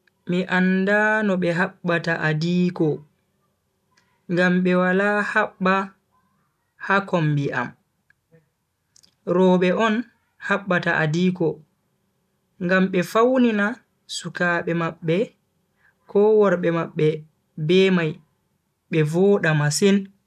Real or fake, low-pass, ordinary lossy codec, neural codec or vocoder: real; 14.4 kHz; none; none